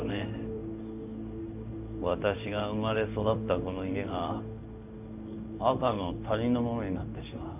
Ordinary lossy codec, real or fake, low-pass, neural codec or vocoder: none; fake; 3.6 kHz; codec, 16 kHz in and 24 kHz out, 1 kbps, XY-Tokenizer